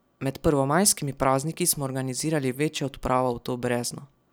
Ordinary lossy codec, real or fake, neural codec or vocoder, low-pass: none; real; none; none